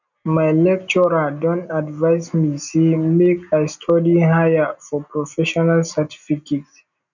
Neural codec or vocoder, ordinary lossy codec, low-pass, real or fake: none; none; 7.2 kHz; real